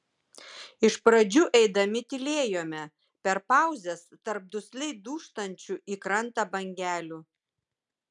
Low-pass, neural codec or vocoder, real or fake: 10.8 kHz; none; real